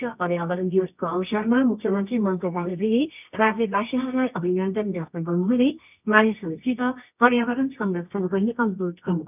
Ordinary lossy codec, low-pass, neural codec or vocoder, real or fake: none; 3.6 kHz; codec, 24 kHz, 0.9 kbps, WavTokenizer, medium music audio release; fake